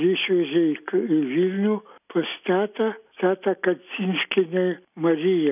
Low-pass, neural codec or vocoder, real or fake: 3.6 kHz; none; real